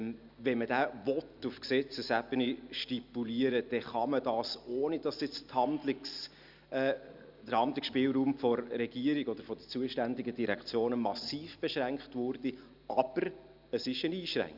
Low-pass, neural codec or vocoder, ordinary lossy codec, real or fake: 5.4 kHz; none; Opus, 64 kbps; real